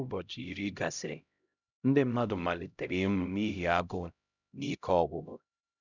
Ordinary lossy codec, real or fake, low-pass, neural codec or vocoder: none; fake; 7.2 kHz; codec, 16 kHz, 0.5 kbps, X-Codec, HuBERT features, trained on LibriSpeech